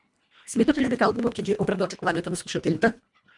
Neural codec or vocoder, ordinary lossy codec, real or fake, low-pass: codec, 24 kHz, 1.5 kbps, HILCodec; MP3, 96 kbps; fake; 10.8 kHz